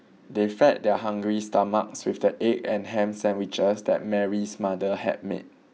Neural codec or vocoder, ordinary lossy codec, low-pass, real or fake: none; none; none; real